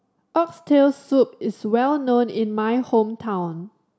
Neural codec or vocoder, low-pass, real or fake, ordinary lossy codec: none; none; real; none